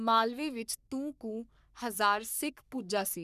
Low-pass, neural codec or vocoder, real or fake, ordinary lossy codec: 14.4 kHz; codec, 44.1 kHz, 3.4 kbps, Pupu-Codec; fake; none